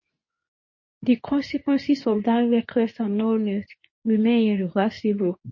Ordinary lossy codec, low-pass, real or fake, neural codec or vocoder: MP3, 32 kbps; 7.2 kHz; fake; codec, 24 kHz, 0.9 kbps, WavTokenizer, medium speech release version 2